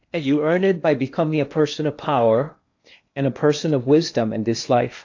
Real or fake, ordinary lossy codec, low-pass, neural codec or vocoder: fake; AAC, 48 kbps; 7.2 kHz; codec, 16 kHz in and 24 kHz out, 0.8 kbps, FocalCodec, streaming, 65536 codes